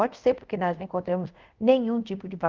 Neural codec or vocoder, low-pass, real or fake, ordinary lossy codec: codec, 24 kHz, 0.5 kbps, DualCodec; 7.2 kHz; fake; Opus, 32 kbps